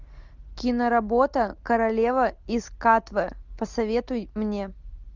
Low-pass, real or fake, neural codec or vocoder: 7.2 kHz; real; none